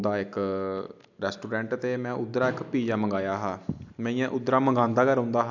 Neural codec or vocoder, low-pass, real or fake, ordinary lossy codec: none; 7.2 kHz; real; none